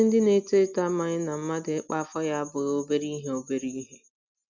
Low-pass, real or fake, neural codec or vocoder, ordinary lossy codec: 7.2 kHz; real; none; none